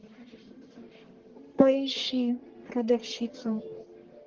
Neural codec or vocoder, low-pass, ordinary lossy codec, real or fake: codec, 44.1 kHz, 1.7 kbps, Pupu-Codec; 7.2 kHz; Opus, 16 kbps; fake